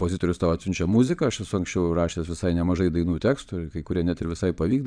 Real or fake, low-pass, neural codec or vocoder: fake; 9.9 kHz; vocoder, 22.05 kHz, 80 mel bands, Vocos